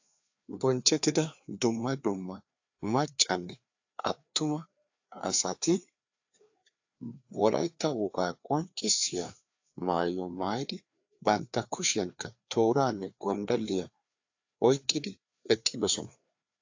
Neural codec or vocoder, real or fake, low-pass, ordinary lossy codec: codec, 16 kHz, 2 kbps, FreqCodec, larger model; fake; 7.2 kHz; AAC, 48 kbps